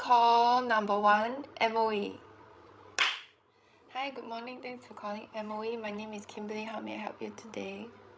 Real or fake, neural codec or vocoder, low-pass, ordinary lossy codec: fake; codec, 16 kHz, 16 kbps, FreqCodec, larger model; none; none